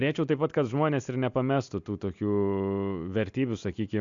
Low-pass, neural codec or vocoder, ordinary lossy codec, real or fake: 7.2 kHz; none; MP3, 64 kbps; real